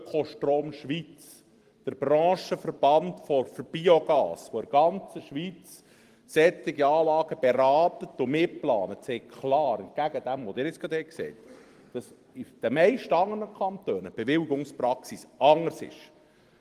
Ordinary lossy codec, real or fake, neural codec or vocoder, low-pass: Opus, 24 kbps; fake; vocoder, 44.1 kHz, 128 mel bands every 256 samples, BigVGAN v2; 14.4 kHz